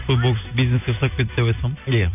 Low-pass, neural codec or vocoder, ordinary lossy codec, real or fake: 3.6 kHz; none; none; real